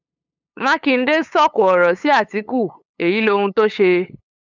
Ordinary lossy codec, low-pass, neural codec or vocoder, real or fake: none; 7.2 kHz; codec, 16 kHz, 8 kbps, FunCodec, trained on LibriTTS, 25 frames a second; fake